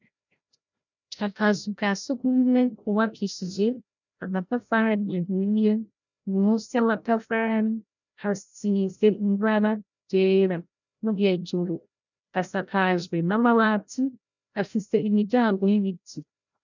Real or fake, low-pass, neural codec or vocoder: fake; 7.2 kHz; codec, 16 kHz, 0.5 kbps, FreqCodec, larger model